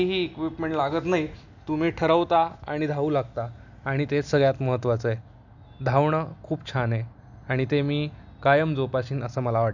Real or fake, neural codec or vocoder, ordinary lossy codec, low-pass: real; none; none; 7.2 kHz